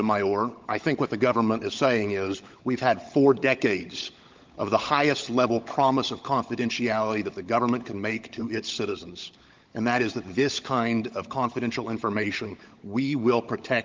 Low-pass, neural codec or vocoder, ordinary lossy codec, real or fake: 7.2 kHz; codec, 16 kHz, 16 kbps, FunCodec, trained on LibriTTS, 50 frames a second; Opus, 32 kbps; fake